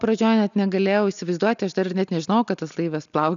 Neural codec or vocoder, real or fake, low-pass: none; real; 7.2 kHz